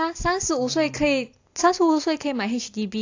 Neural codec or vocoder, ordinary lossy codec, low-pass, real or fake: none; AAC, 48 kbps; 7.2 kHz; real